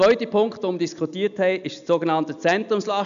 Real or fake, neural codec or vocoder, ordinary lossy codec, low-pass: real; none; none; 7.2 kHz